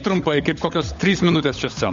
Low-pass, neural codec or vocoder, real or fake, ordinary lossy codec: 7.2 kHz; codec, 16 kHz, 16 kbps, FunCodec, trained on LibriTTS, 50 frames a second; fake; MP3, 48 kbps